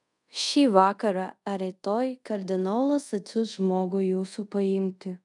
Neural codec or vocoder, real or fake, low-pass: codec, 24 kHz, 0.5 kbps, DualCodec; fake; 10.8 kHz